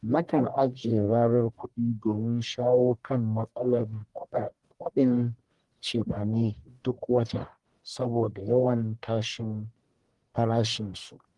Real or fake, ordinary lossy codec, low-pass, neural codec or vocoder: fake; Opus, 24 kbps; 10.8 kHz; codec, 44.1 kHz, 1.7 kbps, Pupu-Codec